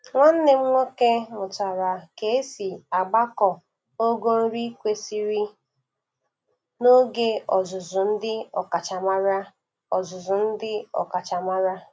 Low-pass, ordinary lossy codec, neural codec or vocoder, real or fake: none; none; none; real